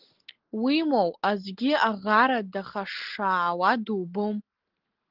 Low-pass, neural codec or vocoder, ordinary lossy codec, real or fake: 5.4 kHz; none; Opus, 16 kbps; real